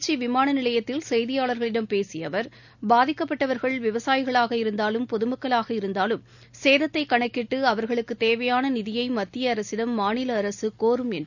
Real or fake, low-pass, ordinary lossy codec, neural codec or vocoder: real; 7.2 kHz; none; none